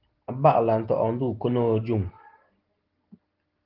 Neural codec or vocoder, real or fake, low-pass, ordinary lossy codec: none; real; 5.4 kHz; Opus, 16 kbps